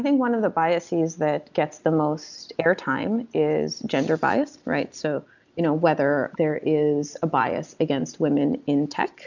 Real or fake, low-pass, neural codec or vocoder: real; 7.2 kHz; none